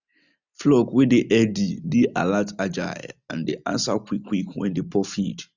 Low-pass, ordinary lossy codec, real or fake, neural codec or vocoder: 7.2 kHz; none; real; none